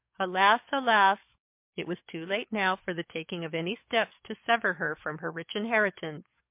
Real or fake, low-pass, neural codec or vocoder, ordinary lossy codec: fake; 3.6 kHz; codec, 44.1 kHz, 7.8 kbps, DAC; MP3, 32 kbps